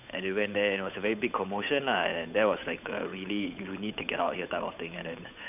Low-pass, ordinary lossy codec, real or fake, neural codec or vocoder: 3.6 kHz; none; fake; codec, 16 kHz, 8 kbps, FunCodec, trained on Chinese and English, 25 frames a second